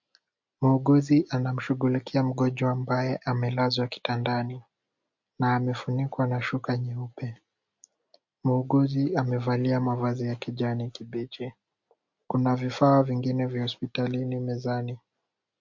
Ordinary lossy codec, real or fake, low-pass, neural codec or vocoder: MP3, 48 kbps; real; 7.2 kHz; none